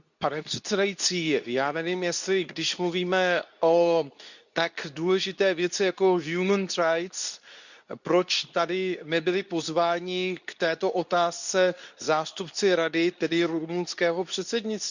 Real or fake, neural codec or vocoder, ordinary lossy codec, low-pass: fake; codec, 24 kHz, 0.9 kbps, WavTokenizer, medium speech release version 2; none; 7.2 kHz